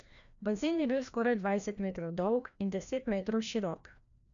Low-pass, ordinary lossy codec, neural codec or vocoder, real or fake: 7.2 kHz; none; codec, 16 kHz, 1 kbps, FreqCodec, larger model; fake